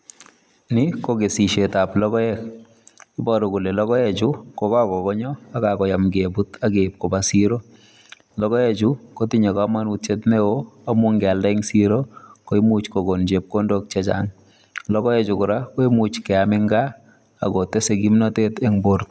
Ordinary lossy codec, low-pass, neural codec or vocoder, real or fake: none; none; none; real